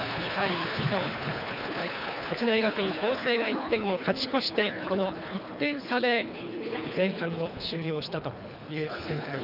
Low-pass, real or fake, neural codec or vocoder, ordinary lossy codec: 5.4 kHz; fake; codec, 24 kHz, 3 kbps, HILCodec; none